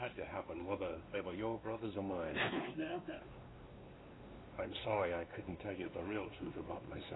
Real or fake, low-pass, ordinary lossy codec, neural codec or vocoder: fake; 7.2 kHz; AAC, 16 kbps; codec, 16 kHz, 2 kbps, X-Codec, WavLM features, trained on Multilingual LibriSpeech